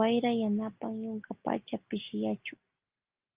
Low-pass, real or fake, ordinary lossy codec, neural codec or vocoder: 3.6 kHz; real; Opus, 32 kbps; none